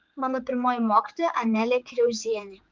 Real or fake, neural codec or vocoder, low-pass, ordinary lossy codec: fake; codec, 16 kHz, 4 kbps, X-Codec, HuBERT features, trained on general audio; 7.2 kHz; Opus, 24 kbps